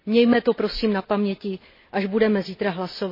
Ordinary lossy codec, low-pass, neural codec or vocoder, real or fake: MP3, 24 kbps; 5.4 kHz; none; real